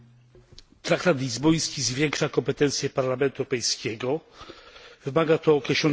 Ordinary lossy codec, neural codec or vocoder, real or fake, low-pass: none; none; real; none